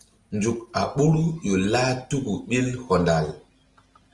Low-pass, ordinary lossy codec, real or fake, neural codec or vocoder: 10.8 kHz; Opus, 24 kbps; real; none